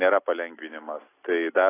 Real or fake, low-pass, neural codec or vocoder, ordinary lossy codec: real; 3.6 kHz; none; AAC, 16 kbps